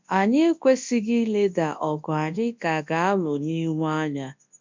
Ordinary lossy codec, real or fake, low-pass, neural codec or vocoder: MP3, 64 kbps; fake; 7.2 kHz; codec, 24 kHz, 0.9 kbps, WavTokenizer, large speech release